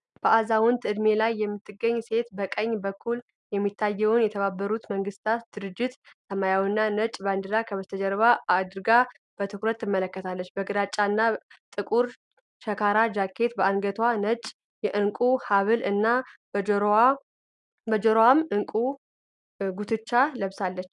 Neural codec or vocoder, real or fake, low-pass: none; real; 10.8 kHz